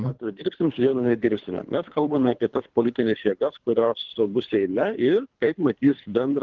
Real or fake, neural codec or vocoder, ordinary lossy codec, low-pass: fake; codec, 24 kHz, 3 kbps, HILCodec; Opus, 16 kbps; 7.2 kHz